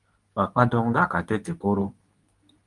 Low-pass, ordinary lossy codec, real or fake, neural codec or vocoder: 10.8 kHz; Opus, 32 kbps; fake; codec, 24 kHz, 0.9 kbps, WavTokenizer, medium speech release version 2